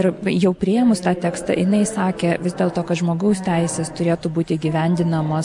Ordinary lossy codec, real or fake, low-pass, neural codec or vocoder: MP3, 64 kbps; real; 10.8 kHz; none